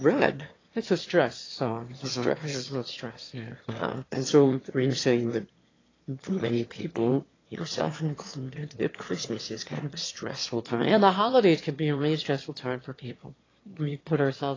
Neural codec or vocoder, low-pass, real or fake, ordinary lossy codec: autoencoder, 22.05 kHz, a latent of 192 numbers a frame, VITS, trained on one speaker; 7.2 kHz; fake; AAC, 32 kbps